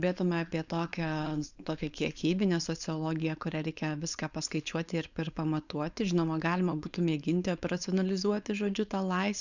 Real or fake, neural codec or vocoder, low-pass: fake; codec, 16 kHz, 4.8 kbps, FACodec; 7.2 kHz